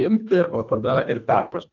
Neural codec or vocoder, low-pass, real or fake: codec, 24 kHz, 1.5 kbps, HILCodec; 7.2 kHz; fake